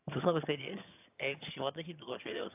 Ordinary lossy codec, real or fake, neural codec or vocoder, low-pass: AAC, 32 kbps; fake; vocoder, 22.05 kHz, 80 mel bands, HiFi-GAN; 3.6 kHz